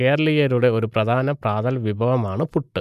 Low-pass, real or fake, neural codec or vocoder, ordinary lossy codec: 14.4 kHz; real; none; none